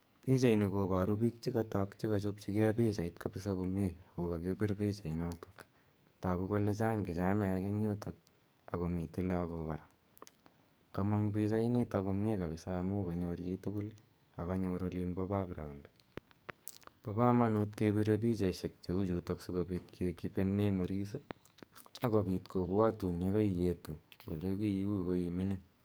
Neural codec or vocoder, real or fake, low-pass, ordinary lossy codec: codec, 44.1 kHz, 2.6 kbps, SNAC; fake; none; none